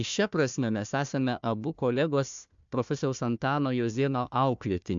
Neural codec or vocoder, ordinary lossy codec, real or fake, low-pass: codec, 16 kHz, 1 kbps, FunCodec, trained on Chinese and English, 50 frames a second; MP3, 64 kbps; fake; 7.2 kHz